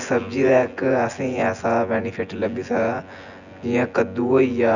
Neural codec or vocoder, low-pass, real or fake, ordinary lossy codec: vocoder, 24 kHz, 100 mel bands, Vocos; 7.2 kHz; fake; none